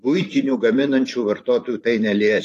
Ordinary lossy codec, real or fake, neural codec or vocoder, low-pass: AAC, 48 kbps; fake; vocoder, 44.1 kHz, 128 mel bands every 512 samples, BigVGAN v2; 14.4 kHz